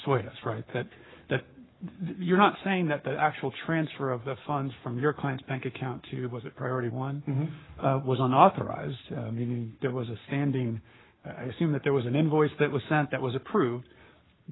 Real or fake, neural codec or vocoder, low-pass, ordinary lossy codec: fake; codec, 44.1 kHz, 7.8 kbps, Pupu-Codec; 7.2 kHz; AAC, 16 kbps